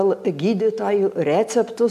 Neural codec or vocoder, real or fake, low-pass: none; real; 14.4 kHz